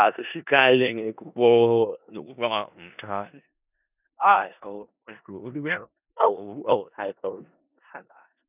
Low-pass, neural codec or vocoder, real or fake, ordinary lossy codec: 3.6 kHz; codec, 16 kHz in and 24 kHz out, 0.4 kbps, LongCat-Audio-Codec, four codebook decoder; fake; none